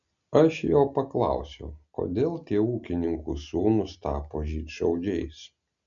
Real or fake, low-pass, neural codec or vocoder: real; 7.2 kHz; none